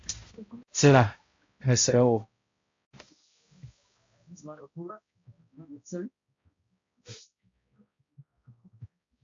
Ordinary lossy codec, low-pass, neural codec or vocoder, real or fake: MP3, 48 kbps; 7.2 kHz; codec, 16 kHz, 0.5 kbps, X-Codec, HuBERT features, trained on balanced general audio; fake